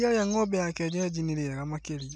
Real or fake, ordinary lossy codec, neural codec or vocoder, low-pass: real; none; none; none